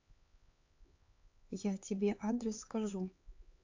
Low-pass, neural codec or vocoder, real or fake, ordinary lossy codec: 7.2 kHz; codec, 16 kHz, 4 kbps, X-Codec, HuBERT features, trained on LibriSpeech; fake; AAC, 48 kbps